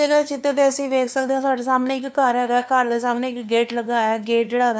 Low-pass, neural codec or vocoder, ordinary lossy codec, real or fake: none; codec, 16 kHz, 2 kbps, FunCodec, trained on LibriTTS, 25 frames a second; none; fake